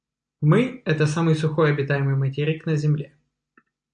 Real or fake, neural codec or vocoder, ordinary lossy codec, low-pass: real; none; AAC, 64 kbps; 9.9 kHz